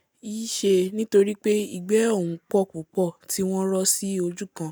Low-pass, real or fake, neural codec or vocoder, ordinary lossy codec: none; real; none; none